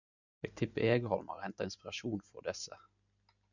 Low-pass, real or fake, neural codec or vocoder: 7.2 kHz; real; none